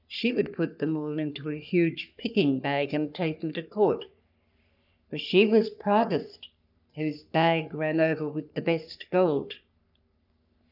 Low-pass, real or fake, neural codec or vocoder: 5.4 kHz; fake; codec, 44.1 kHz, 3.4 kbps, Pupu-Codec